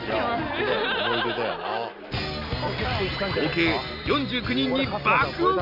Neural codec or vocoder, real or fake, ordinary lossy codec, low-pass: none; real; none; 5.4 kHz